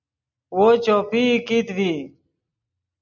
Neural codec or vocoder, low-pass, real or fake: none; 7.2 kHz; real